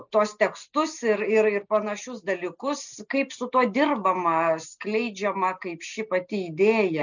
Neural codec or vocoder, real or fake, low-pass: none; real; 7.2 kHz